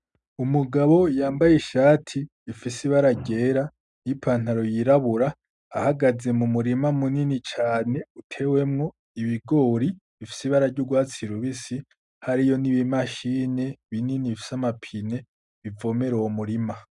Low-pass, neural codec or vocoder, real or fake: 10.8 kHz; none; real